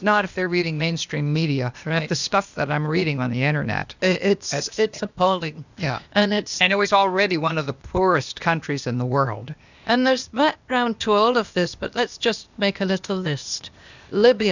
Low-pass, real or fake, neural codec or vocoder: 7.2 kHz; fake; codec, 16 kHz, 0.8 kbps, ZipCodec